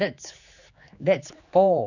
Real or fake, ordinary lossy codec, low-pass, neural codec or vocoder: fake; none; 7.2 kHz; codec, 16 kHz, 4 kbps, X-Codec, HuBERT features, trained on general audio